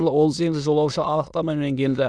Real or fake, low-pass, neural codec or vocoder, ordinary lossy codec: fake; none; autoencoder, 22.05 kHz, a latent of 192 numbers a frame, VITS, trained on many speakers; none